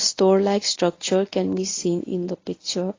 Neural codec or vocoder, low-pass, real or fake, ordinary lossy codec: codec, 24 kHz, 0.9 kbps, WavTokenizer, medium speech release version 1; 7.2 kHz; fake; AAC, 32 kbps